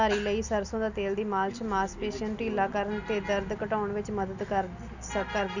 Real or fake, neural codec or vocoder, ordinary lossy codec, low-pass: real; none; none; 7.2 kHz